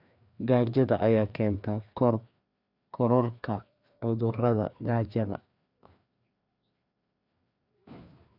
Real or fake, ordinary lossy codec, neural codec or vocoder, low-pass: fake; none; codec, 16 kHz, 2 kbps, FreqCodec, larger model; 5.4 kHz